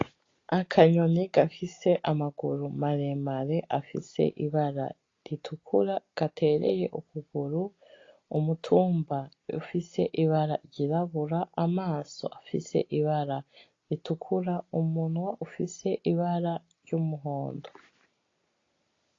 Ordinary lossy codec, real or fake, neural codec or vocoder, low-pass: AAC, 32 kbps; real; none; 7.2 kHz